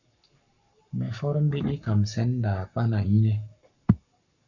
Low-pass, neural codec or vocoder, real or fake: 7.2 kHz; codec, 44.1 kHz, 7.8 kbps, Pupu-Codec; fake